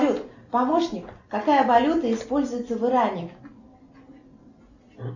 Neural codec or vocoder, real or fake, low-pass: none; real; 7.2 kHz